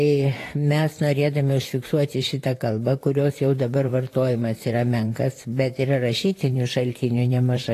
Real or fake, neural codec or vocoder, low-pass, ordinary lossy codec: fake; vocoder, 44.1 kHz, 128 mel bands, Pupu-Vocoder; 14.4 kHz; AAC, 48 kbps